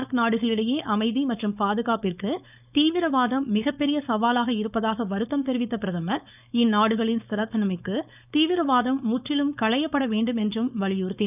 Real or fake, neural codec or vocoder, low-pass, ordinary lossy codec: fake; codec, 16 kHz, 4.8 kbps, FACodec; 3.6 kHz; none